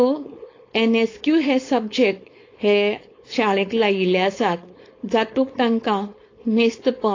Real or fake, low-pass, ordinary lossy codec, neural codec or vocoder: fake; 7.2 kHz; AAC, 32 kbps; codec, 16 kHz, 4.8 kbps, FACodec